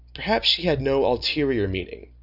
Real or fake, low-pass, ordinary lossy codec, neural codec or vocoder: real; 5.4 kHz; AAC, 48 kbps; none